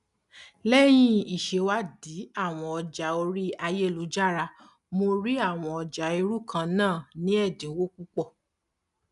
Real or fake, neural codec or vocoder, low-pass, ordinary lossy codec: real; none; 10.8 kHz; none